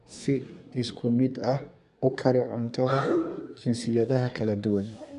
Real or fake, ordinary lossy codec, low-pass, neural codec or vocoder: fake; none; 10.8 kHz; codec, 24 kHz, 1 kbps, SNAC